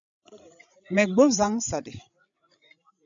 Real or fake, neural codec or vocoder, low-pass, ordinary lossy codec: fake; codec, 16 kHz, 16 kbps, FreqCodec, larger model; 7.2 kHz; AAC, 64 kbps